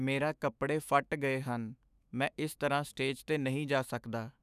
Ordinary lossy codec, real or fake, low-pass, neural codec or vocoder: none; fake; 14.4 kHz; autoencoder, 48 kHz, 128 numbers a frame, DAC-VAE, trained on Japanese speech